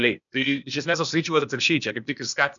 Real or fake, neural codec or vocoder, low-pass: fake; codec, 16 kHz, 0.8 kbps, ZipCodec; 7.2 kHz